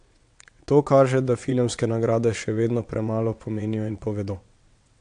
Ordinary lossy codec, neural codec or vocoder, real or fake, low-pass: AAC, 64 kbps; vocoder, 22.05 kHz, 80 mel bands, WaveNeXt; fake; 9.9 kHz